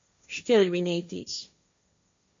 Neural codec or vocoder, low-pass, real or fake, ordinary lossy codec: codec, 16 kHz, 1.1 kbps, Voila-Tokenizer; 7.2 kHz; fake; MP3, 48 kbps